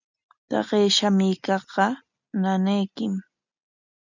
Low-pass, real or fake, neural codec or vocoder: 7.2 kHz; real; none